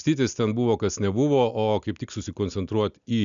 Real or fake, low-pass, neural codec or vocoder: real; 7.2 kHz; none